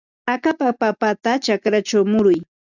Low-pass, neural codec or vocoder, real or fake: 7.2 kHz; none; real